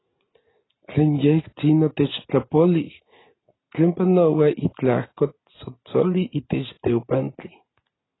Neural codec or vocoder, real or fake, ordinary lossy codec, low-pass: none; real; AAC, 16 kbps; 7.2 kHz